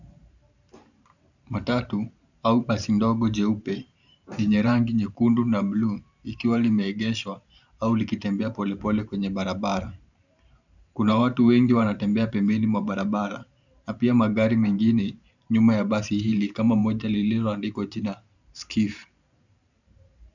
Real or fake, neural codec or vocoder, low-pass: fake; vocoder, 44.1 kHz, 128 mel bands every 512 samples, BigVGAN v2; 7.2 kHz